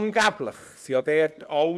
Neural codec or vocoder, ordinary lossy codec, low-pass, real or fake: codec, 24 kHz, 0.9 kbps, WavTokenizer, small release; none; none; fake